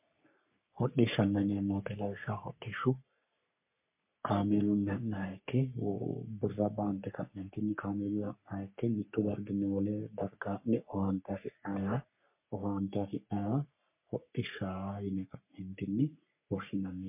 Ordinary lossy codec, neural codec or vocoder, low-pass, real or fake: MP3, 32 kbps; codec, 44.1 kHz, 3.4 kbps, Pupu-Codec; 3.6 kHz; fake